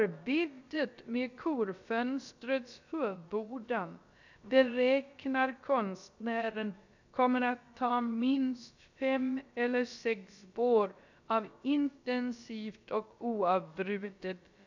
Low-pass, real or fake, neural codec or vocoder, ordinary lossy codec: 7.2 kHz; fake; codec, 16 kHz, about 1 kbps, DyCAST, with the encoder's durations; none